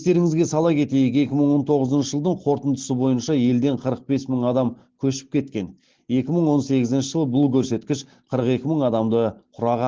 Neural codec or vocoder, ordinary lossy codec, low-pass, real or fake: none; Opus, 16 kbps; 7.2 kHz; real